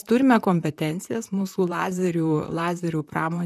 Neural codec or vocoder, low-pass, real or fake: vocoder, 44.1 kHz, 128 mel bands, Pupu-Vocoder; 14.4 kHz; fake